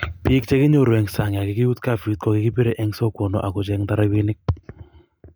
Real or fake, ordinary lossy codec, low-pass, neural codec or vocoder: real; none; none; none